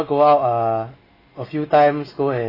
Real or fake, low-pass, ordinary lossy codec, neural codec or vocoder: real; 5.4 kHz; AAC, 24 kbps; none